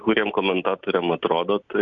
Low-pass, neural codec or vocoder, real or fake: 7.2 kHz; none; real